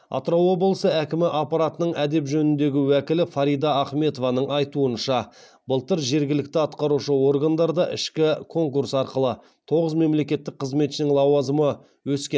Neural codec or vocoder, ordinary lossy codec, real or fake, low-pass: none; none; real; none